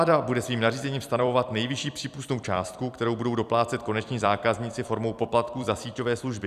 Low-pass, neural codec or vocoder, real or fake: 14.4 kHz; vocoder, 44.1 kHz, 128 mel bands every 256 samples, BigVGAN v2; fake